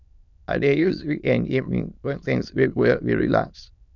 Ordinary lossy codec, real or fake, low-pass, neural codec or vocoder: none; fake; 7.2 kHz; autoencoder, 22.05 kHz, a latent of 192 numbers a frame, VITS, trained on many speakers